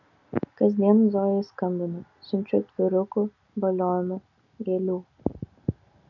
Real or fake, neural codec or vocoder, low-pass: real; none; 7.2 kHz